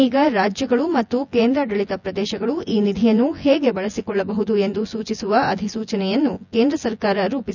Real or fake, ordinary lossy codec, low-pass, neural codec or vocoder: fake; none; 7.2 kHz; vocoder, 24 kHz, 100 mel bands, Vocos